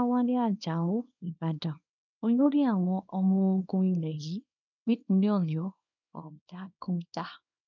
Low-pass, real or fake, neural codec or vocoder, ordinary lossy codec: 7.2 kHz; fake; codec, 24 kHz, 0.9 kbps, WavTokenizer, small release; none